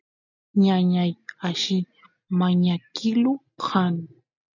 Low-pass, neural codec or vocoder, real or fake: 7.2 kHz; none; real